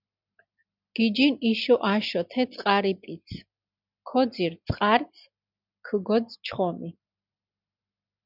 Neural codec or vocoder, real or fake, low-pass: none; real; 5.4 kHz